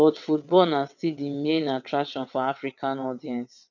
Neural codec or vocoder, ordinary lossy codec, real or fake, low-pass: vocoder, 22.05 kHz, 80 mel bands, WaveNeXt; none; fake; 7.2 kHz